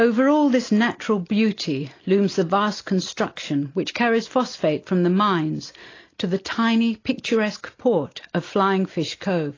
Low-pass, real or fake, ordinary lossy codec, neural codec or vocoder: 7.2 kHz; real; AAC, 32 kbps; none